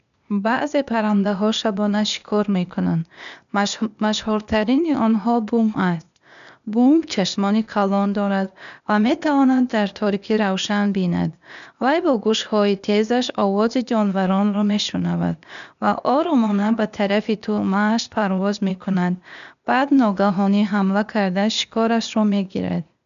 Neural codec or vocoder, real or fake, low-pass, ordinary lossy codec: codec, 16 kHz, 0.8 kbps, ZipCodec; fake; 7.2 kHz; none